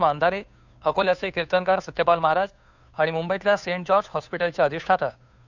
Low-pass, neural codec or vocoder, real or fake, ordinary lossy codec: 7.2 kHz; codec, 16 kHz, 2 kbps, FunCodec, trained on Chinese and English, 25 frames a second; fake; none